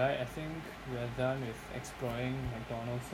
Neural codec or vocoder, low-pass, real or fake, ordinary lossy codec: none; 19.8 kHz; real; none